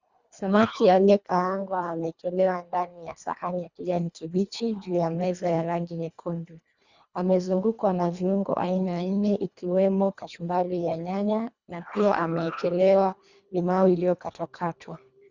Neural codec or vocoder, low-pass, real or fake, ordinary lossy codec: codec, 24 kHz, 1.5 kbps, HILCodec; 7.2 kHz; fake; Opus, 64 kbps